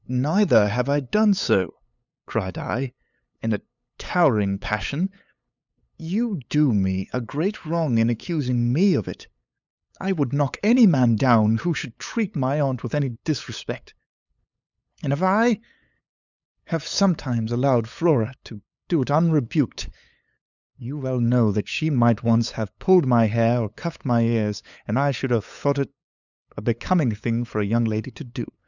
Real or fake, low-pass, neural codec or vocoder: fake; 7.2 kHz; codec, 16 kHz, 8 kbps, FunCodec, trained on LibriTTS, 25 frames a second